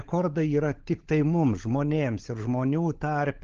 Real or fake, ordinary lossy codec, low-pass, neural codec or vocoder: fake; Opus, 16 kbps; 7.2 kHz; codec, 16 kHz, 16 kbps, FunCodec, trained on LibriTTS, 50 frames a second